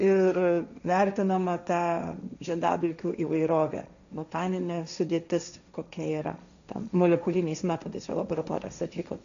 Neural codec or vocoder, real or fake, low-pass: codec, 16 kHz, 1.1 kbps, Voila-Tokenizer; fake; 7.2 kHz